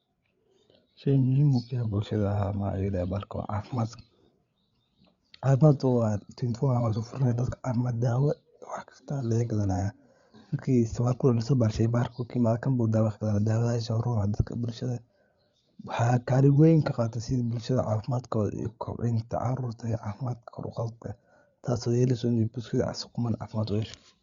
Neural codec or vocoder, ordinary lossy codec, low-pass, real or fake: codec, 16 kHz, 8 kbps, FreqCodec, larger model; Opus, 64 kbps; 7.2 kHz; fake